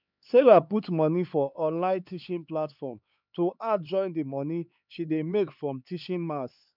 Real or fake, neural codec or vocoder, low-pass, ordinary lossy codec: fake; codec, 16 kHz, 4 kbps, X-Codec, HuBERT features, trained on LibriSpeech; 5.4 kHz; none